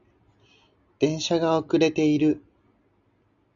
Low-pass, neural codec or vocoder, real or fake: 7.2 kHz; none; real